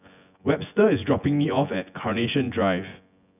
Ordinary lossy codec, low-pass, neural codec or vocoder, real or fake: none; 3.6 kHz; vocoder, 24 kHz, 100 mel bands, Vocos; fake